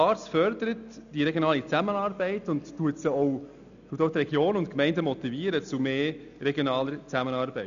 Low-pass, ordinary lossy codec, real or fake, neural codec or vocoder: 7.2 kHz; none; real; none